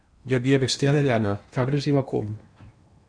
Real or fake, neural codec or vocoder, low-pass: fake; codec, 16 kHz in and 24 kHz out, 0.8 kbps, FocalCodec, streaming, 65536 codes; 9.9 kHz